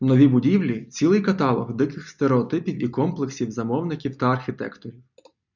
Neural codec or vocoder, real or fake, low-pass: none; real; 7.2 kHz